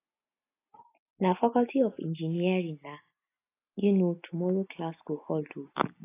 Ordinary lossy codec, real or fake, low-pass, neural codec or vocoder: AAC, 24 kbps; real; 3.6 kHz; none